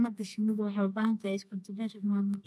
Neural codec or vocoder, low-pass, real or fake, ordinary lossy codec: codec, 24 kHz, 0.9 kbps, WavTokenizer, medium music audio release; none; fake; none